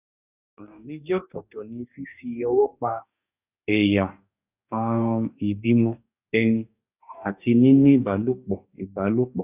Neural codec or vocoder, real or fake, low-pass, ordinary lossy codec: codec, 44.1 kHz, 2.6 kbps, DAC; fake; 3.6 kHz; none